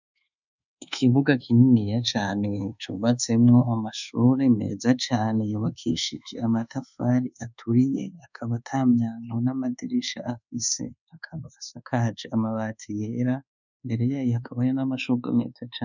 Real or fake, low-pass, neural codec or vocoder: fake; 7.2 kHz; codec, 24 kHz, 1.2 kbps, DualCodec